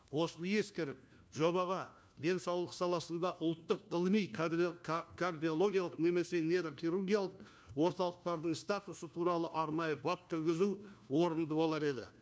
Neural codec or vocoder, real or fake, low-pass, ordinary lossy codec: codec, 16 kHz, 1 kbps, FunCodec, trained on LibriTTS, 50 frames a second; fake; none; none